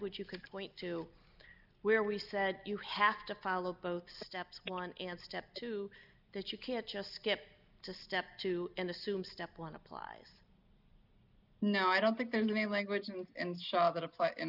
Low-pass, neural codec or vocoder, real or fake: 5.4 kHz; none; real